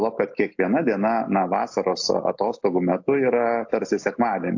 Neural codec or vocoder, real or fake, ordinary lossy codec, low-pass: none; real; AAC, 48 kbps; 7.2 kHz